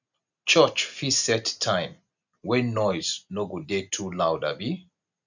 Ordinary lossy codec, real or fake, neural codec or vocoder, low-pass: none; real; none; 7.2 kHz